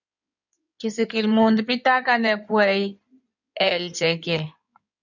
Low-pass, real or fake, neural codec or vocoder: 7.2 kHz; fake; codec, 16 kHz in and 24 kHz out, 2.2 kbps, FireRedTTS-2 codec